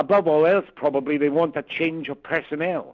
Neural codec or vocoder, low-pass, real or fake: none; 7.2 kHz; real